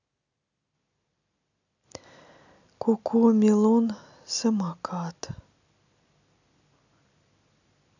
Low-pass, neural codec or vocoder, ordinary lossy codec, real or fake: 7.2 kHz; none; none; real